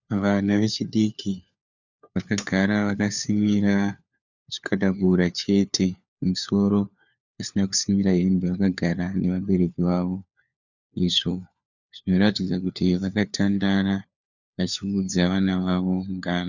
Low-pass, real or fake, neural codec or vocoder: 7.2 kHz; fake; codec, 16 kHz, 4 kbps, FunCodec, trained on LibriTTS, 50 frames a second